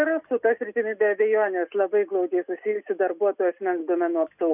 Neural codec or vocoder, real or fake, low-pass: none; real; 3.6 kHz